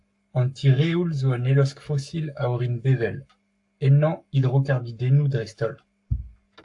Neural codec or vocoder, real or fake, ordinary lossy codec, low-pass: codec, 44.1 kHz, 7.8 kbps, Pupu-Codec; fake; AAC, 64 kbps; 10.8 kHz